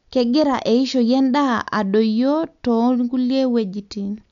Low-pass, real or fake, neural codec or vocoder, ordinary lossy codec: 7.2 kHz; real; none; none